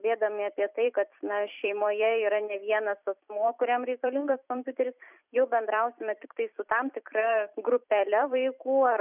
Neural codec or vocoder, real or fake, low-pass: vocoder, 44.1 kHz, 128 mel bands every 256 samples, BigVGAN v2; fake; 3.6 kHz